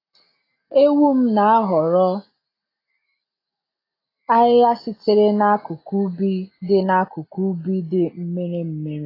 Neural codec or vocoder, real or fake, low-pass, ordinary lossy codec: none; real; 5.4 kHz; AAC, 24 kbps